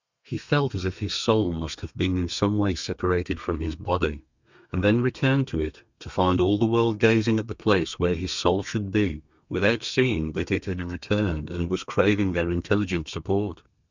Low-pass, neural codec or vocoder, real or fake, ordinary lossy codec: 7.2 kHz; codec, 32 kHz, 1.9 kbps, SNAC; fake; Opus, 64 kbps